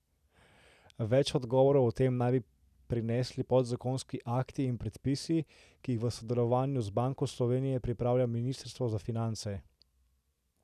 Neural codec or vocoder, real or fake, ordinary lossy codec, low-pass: none; real; none; 14.4 kHz